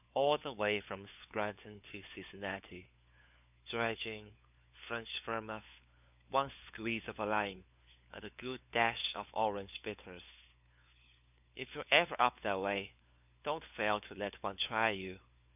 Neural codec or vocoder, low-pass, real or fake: codec, 16 kHz, 4 kbps, FunCodec, trained on LibriTTS, 50 frames a second; 3.6 kHz; fake